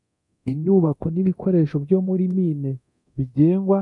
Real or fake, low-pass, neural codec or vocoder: fake; 10.8 kHz; codec, 24 kHz, 0.9 kbps, DualCodec